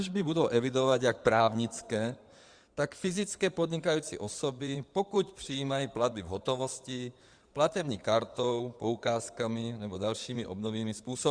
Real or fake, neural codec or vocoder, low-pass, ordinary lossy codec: fake; codec, 16 kHz in and 24 kHz out, 2.2 kbps, FireRedTTS-2 codec; 9.9 kHz; Opus, 64 kbps